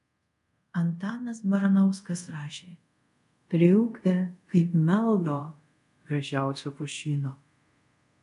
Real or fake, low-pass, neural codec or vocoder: fake; 10.8 kHz; codec, 24 kHz, 0.5 kbps, DualCodec